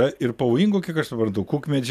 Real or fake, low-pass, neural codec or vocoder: real; 14.4 kHz; none